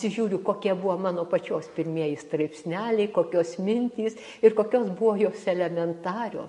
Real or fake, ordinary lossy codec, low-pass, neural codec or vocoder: real; MP3, 48 kbps; 14.4 kHz; none